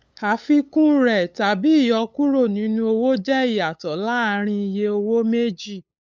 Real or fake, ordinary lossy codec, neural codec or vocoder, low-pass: fake; none; codec, 16 kHz, 8 kbps, FunCodec, trained on LibriTTS, 25 frames a second; none